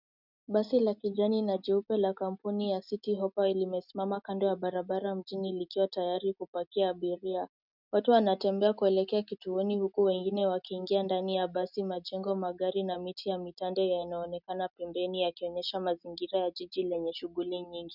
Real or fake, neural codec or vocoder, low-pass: real; none; 5.4 kHz